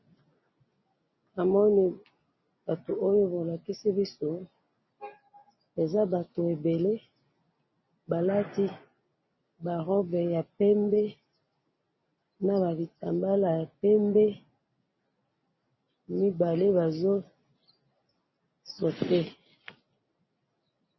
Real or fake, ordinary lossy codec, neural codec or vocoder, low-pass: real; MP3, 24 kbps; none; 7.2 kHz